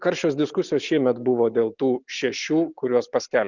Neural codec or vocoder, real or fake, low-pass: none; real; 7.2 kHz